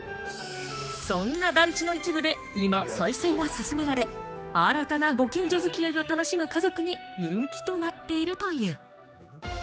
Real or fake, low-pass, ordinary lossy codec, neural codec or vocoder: fake; none; none; codec, 16 kHz, 2 kbps, X-Codec, HuBERT features, trained on general audio